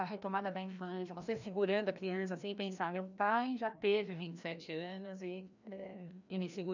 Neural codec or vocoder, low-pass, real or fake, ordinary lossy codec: codec, 16 kHz, 1 kbps, FreqCodec, larger model; 7.2 kHz; fake; none